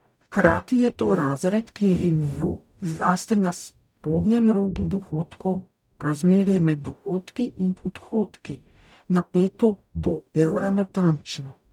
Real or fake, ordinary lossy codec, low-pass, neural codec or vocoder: fake; none; 19.8 kHz; codec, 44.1 kHz, 0.9 kbps, DAC